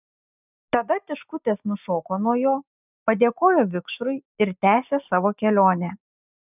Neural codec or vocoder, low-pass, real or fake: none; 3.6 kHz; real